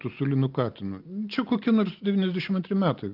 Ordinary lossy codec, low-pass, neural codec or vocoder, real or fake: Opus, 32 kbps; 5.4 kHz; none; real